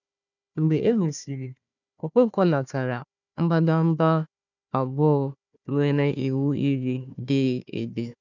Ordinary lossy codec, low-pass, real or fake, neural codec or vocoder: none; 7.2 kHz; fake; codec, 16 kHz, 1 kbps, FunCodec, trained on Chinese and English, 50 frames a second